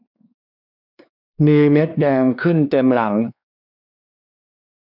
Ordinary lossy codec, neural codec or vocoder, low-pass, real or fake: none; codec, 16 kHz, 2 kbps, X-Codec, WavLM features, trained on Multilingual LibriSpeech; 5.4 kHz; fake